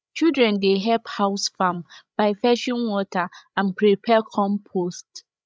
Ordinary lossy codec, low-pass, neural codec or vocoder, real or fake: none; none; codec, 16 kHz, 16 kbps, FreqCodec, larger model; fake